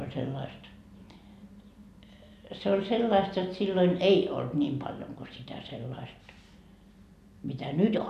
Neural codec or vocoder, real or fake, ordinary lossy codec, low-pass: none; real; none; 14.4 kHz